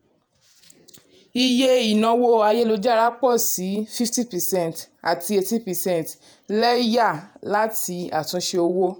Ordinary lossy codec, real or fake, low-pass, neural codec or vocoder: none; fake; none; vocoder, 48 kHz, 128 mel bands, Vocos